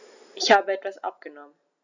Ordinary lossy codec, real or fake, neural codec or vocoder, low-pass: none; real; none; 7.2 kHz